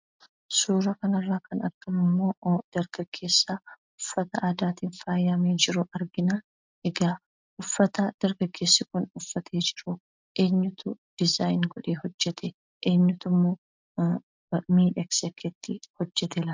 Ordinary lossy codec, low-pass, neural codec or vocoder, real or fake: MP3, 64 kbps; 7.2 kHz; none; real